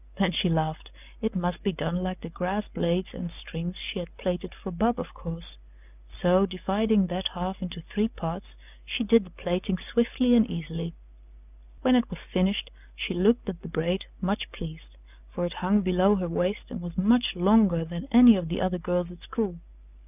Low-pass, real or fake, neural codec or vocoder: 3.6 kHz; real; none